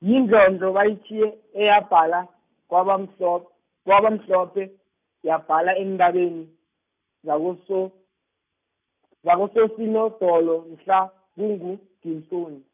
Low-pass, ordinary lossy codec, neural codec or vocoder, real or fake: 3.6 kHz; none; none; real